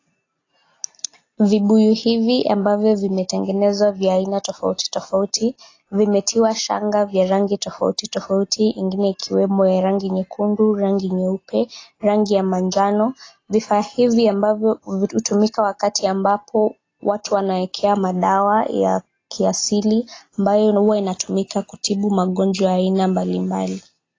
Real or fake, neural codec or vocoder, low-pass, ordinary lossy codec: real; none; 7.2 kHz; AAC, 32 kbps